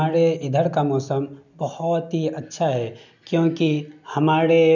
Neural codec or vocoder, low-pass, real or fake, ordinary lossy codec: none; 7.2 kHz; real; none